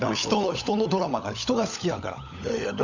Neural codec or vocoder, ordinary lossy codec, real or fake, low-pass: codec, 16 kHz, 16 kbps, FunCodec, trained on LibriTTS, 50 frames a second; none; fake; 7.2 kHz